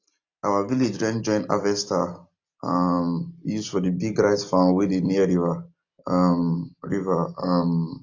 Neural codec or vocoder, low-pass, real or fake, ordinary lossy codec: none; 7.2 kHz; real; AAC, 48 kbps